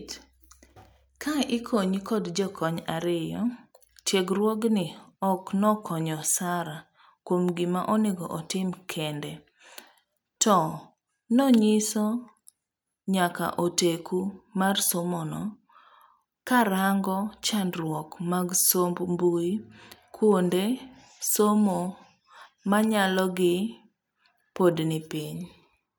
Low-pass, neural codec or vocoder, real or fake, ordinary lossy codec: none; none; real; none